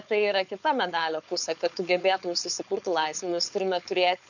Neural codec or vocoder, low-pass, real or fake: codec, 16 kHz, 4.8 kbps, FACodec; 7.2 kHz; fake